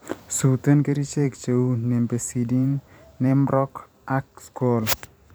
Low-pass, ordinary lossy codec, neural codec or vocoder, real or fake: none; none; vocoder, 44.1 kHz, 128 mel bands every 512 samples, BigVGAN v2; fake